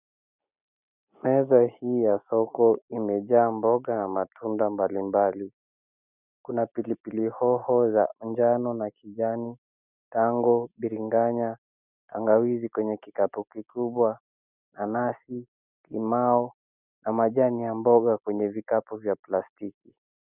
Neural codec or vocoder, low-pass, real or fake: none; 3.6 kHz; real